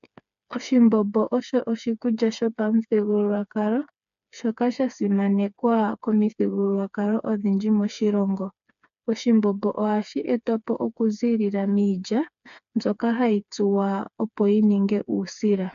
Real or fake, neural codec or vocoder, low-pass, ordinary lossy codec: fake; codec, 16 kHz, 4 kbps, FreqCodec, smaller model; 7.2 kHz; MP3, 64 kbps